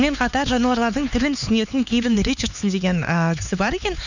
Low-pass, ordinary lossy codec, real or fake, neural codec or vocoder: 7.2 kHz; none; fake; codec, 16 kHz, 4 kbps, X-Codec, HuBERT features, trained on LibriSpeech